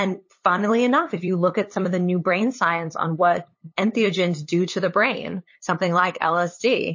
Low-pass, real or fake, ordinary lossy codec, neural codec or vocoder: 7.2 kHz; fake; MP3, 32 kbps; codec, 16 kHz, 8 kbps, FunCodec, trained on LibriTTS, 25 frames a second